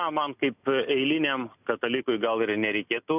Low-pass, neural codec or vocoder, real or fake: 3.6 kHz; none; real